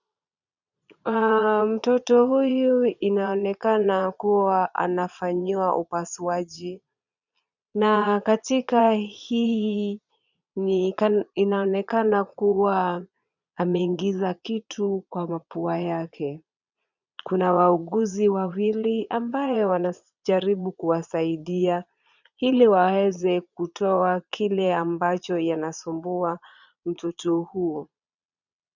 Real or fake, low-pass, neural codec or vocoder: fake; 7.2 kHz; vocoder, 22.05 kHz, 80 mel bands, Vocos